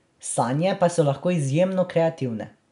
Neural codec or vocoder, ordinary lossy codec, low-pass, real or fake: none; none; 10.8 kHz; real